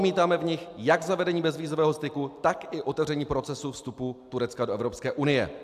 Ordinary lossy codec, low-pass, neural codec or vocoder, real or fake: AAC, 96 kbps; 14.4 kHz; none; real